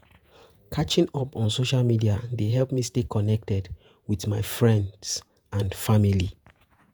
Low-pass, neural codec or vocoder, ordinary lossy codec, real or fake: none; vocoder, 48 kHz, 128 mel bands, Vocos; none; fake